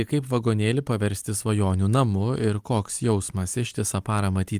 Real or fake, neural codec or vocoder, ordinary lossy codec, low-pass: real; none; Opus, 32 kbps; 14.4 kHz